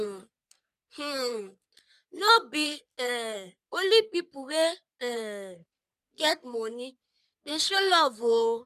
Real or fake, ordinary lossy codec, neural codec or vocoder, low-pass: fake; none; codec, 44.1 kHz, 3.4 kbps, Pupu-Codec; 14.4 kHz